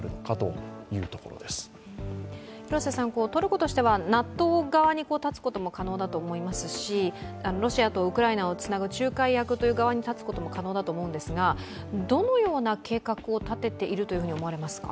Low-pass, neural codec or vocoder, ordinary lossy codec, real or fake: none; none; none; real